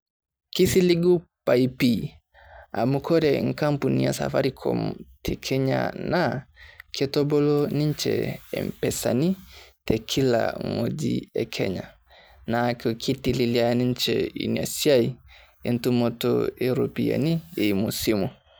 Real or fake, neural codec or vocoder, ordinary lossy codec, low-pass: real; none; none; none